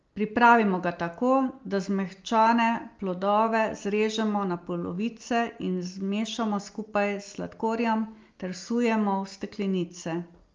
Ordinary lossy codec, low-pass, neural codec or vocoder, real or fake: Opus, 32 kbps; 7.2 kHz; none; real